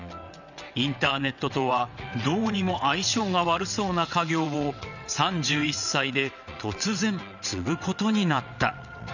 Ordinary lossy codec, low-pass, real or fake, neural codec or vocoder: none; 7.2 kHz; fake; vocoder, 22.05 kHz, 80 mel bands, WaveNeXt